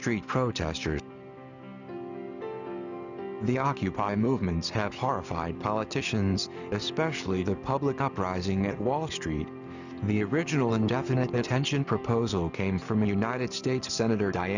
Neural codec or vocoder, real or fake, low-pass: none; real; 7.2 kHz